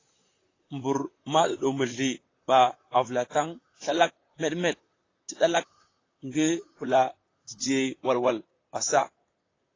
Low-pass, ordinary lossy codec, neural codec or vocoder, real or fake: 7.2 kHz; AAC, 32 kbps; vocoder, 44.1 kHz, 128 mel bands, Pupu-Vocoder; fake